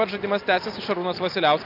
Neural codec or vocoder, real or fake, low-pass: none; real; 5.4 kHz